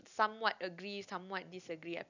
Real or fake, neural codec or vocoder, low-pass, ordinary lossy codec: real; none; 7.2 kHz; none